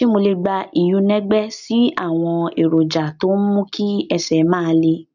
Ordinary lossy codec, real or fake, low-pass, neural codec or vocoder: none; real; 7.2 kHz; none